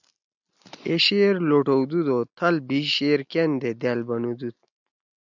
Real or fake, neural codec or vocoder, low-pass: real; none; 7.2 kHz